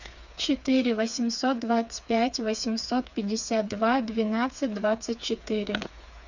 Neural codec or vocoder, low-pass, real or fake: codec, 16 kHz, 4 kbps, FreqCodec, smaller model; 7.2 kHz; fake